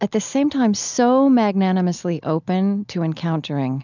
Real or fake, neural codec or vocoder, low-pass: real; none; 7.2 kHz